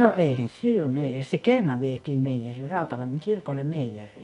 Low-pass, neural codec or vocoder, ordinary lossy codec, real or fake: 10.8 kHz; codec, 24 kHz, 0.9 kbps, WavTokenizer, medium music audio release; none; fake